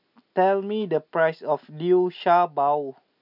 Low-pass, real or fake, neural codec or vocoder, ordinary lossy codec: 5.4 kHz; real; none; none